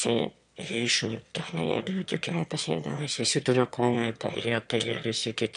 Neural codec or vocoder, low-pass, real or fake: autoencoder, 22.05 kHz, a latent of 192 numbers a frame, VITS, trained on one speaker; 9.9 kHz; fake